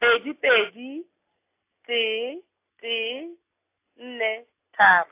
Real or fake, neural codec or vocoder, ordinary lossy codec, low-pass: real; none; AAC, 24 kbps; 3.6 kHz